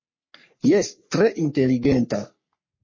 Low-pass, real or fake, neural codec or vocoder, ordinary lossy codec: 7.2 kHz; fake; codec, 44.1 kHz, 3.4 kbps, Pupu-Codec; MP3, 32 kbps